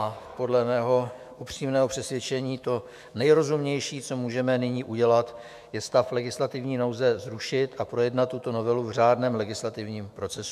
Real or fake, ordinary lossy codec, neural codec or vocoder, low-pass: fake; MP3, 96 kbps; autoencoder, 48 kHz, 128 numbers a frame, DAC-VAE, trained on Japanese speech; 14.4 kHz